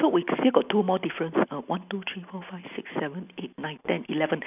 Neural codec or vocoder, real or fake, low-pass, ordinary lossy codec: none; real; 3.6 kHz; none